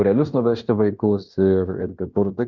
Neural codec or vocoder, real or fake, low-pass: codec, 16 kHz in and 24 kHz out, 0.9 kbps, LongCat-Audio-Codec, fine tuned four codebook decoder; fake; 7.2 kHz